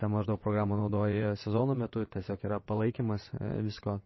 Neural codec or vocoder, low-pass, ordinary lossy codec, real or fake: vocoder, 22.05 kHz, 80 mel bands, WaveNeXt; 7.2 kHz; MP3, 24 kbps; fake